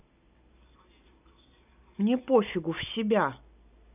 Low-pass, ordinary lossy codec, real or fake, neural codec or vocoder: 3.6 kHz; none; real; none